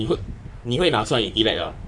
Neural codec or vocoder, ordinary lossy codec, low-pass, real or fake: codec, 44.1 kHz, 3.4 kbps, Pupu-Codec; none; 10.8 kHz; fake